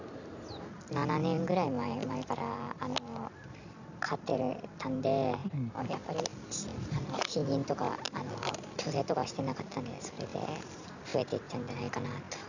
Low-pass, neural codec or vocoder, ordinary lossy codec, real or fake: 7.2 kHz; none; none; real